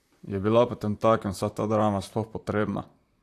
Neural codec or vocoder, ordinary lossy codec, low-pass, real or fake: vocoder, 44.1 kHz, 128 mel bands, Pupu-Vocoder; AAC, 64 kbps; 14.4 kHz; fake